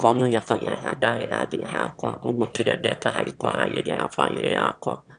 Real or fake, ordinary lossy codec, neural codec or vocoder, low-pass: fake; none; autoencoder, 22.05 kHz, a latent of 192 numbers a frame, VITS, trained on one speaker; 9.9 kHz